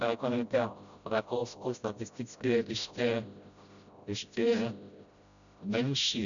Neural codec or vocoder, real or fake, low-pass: codec, 16 kHz, 0.5 kbps, FreqCodec, smaller model; fake; 7.2 kHz